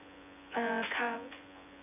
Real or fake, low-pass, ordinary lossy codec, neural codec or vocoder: fake; 3.6 kHz; none; vocoder, 24 kHz, 100 mel bands, Vocos